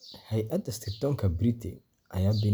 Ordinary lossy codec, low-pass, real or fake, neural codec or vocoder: none; none; real; none